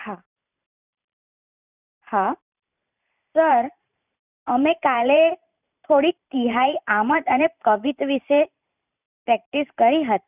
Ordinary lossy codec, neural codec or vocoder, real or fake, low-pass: none; vocoder, 44.1 kHz, 128 mel bands every 512 samples, BigVGAN v2; fake; 3.6 kHz